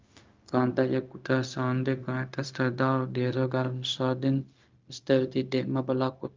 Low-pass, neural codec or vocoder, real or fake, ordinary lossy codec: 7.2 kHz; codec, 16 kHz, 0.4 kbps, LongCat-Audio-Codec; fake; Opus, 24 kbps